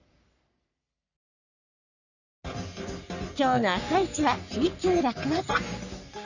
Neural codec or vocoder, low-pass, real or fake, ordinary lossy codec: codec, 44.1 kHz, 3.4 kbps, Pupu-Codec; 7.2 kHz; fake; none